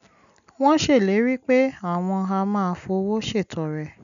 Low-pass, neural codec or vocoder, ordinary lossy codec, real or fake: 7.2 kHz; none; none; real